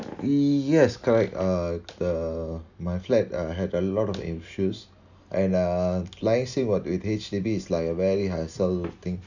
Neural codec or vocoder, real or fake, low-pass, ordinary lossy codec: none; real; 7.2 kHz; none